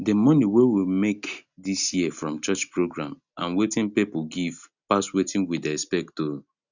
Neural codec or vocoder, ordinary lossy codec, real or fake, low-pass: none; none; real; 7.2 kHz